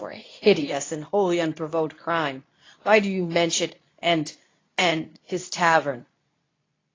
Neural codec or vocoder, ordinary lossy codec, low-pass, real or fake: codec, 24 kHz, 0.9 kbps, WavTokenizer, medium speech release version 2; AAC, 32 kbps; 7.2 kHz; fake